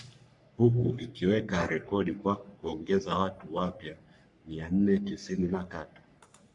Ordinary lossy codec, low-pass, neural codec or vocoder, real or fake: MP3, 64 kbps; 10.8 kHz; codec, 44.1 kHz, 3.4 kbps, Pupu-Codec; fake